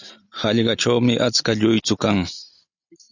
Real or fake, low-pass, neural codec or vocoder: real; 7.2 kHz; none